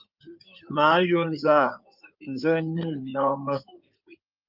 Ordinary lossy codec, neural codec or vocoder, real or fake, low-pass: Opus, 24 kbps; codec, 16 kHz in and 24 kHz out, 2.2 kbps, FireRedTTS-2 codec; fake; 5.4 kHz